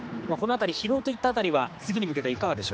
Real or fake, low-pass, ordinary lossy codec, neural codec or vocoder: fake; none; none; codec, 16 kHz, 2 kbps, X-Codec, HuBERT features, trained on general audio